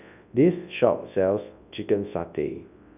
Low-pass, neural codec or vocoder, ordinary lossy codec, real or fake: 3.6 kHz; codec, 24 kHz, 0.9 kbps, WavTokenizer, large speech release; none; fake